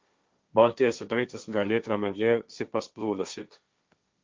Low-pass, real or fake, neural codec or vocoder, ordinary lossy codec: 7.2 kHz; fake; codec, 16 kHz, 1.1 kbps, Voila-Tokenizer; Opus, 24 kbps